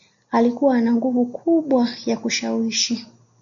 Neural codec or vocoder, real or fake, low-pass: none; real; 7.2 kHz